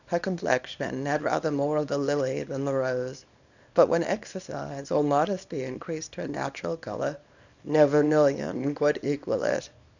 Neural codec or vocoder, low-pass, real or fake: codec, 24 kHz, 0.9 kbps, WavTokenizer, small release; 7.2 kHz; fake